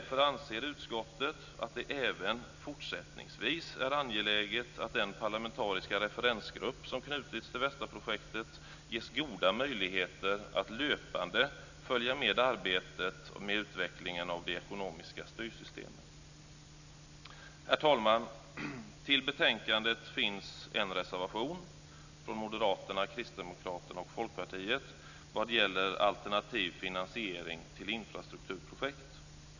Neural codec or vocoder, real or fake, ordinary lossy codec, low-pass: none; real; none; 7.2 kHz